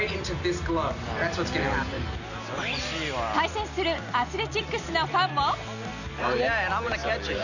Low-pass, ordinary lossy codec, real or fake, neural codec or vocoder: 7.2 kHz; none; real; none